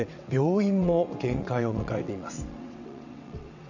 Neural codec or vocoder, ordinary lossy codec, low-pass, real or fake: vocoder, 22.05 kHz, 80 mel bands, WaveNeXt; none; 7.2 kHz; fake